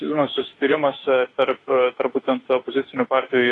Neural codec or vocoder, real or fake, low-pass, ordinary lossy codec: autoencoder, 48 kHz, 32 numbers a frame, DAC-VAE, trained on Japanese speech; fake; 10.8 kHz; AAC, 32 kbps